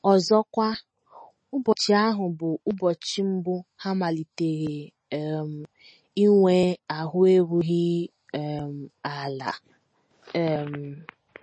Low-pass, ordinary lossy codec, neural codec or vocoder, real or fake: 9.9 kHz; MP3, 32 kbps; none; real